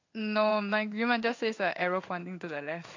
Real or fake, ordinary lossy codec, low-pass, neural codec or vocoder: fake; AAC, 48 kbps; 7.2 kHz; codec, 16 kHz in and 24 kHz out, 1 kbps, XY-Tokenizer